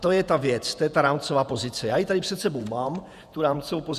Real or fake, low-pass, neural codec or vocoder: fake; 14.4 kHz; vocoder, 48 kHz, 128 mel bands, Vocos